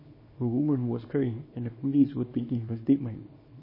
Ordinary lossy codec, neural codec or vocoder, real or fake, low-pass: MP3, 24 kbps; codec, 24 kHz, 0.9 kbps, WavTokenizer, small release; fake; 5.4 kHz